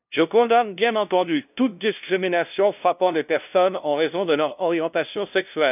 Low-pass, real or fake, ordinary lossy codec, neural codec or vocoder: 3.6 kHz; fake; none; codec, 16 kHz, 0.5 kbps, FunCodec, trained on LibriTTS, 25 frames a second